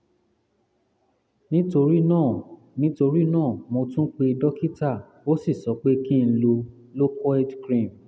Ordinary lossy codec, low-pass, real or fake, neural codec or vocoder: none; none; real; none